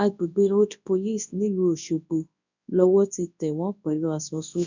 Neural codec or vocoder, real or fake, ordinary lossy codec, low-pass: codec, 24 kHz, 0.9 kbps, WavTokenizer, large speech release; fake; none; 7.2 kHz